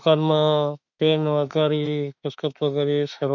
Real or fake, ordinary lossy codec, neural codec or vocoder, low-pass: fake; none; autoencoder, 48 kHz, 32 numbers a frame, DAC-VAE, trained on Japanese speech; 7.2 kHz